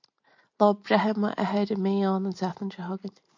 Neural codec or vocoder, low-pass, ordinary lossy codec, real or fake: none; 7.2 kHz; MP3, 48 kbps; real